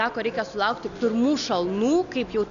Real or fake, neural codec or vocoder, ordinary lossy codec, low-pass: real; none; MP3, 96 kbps; 7.2 kHz